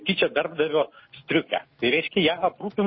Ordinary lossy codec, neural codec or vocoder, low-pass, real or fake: MP3, 24 kbps; none; 7.2 kHz; real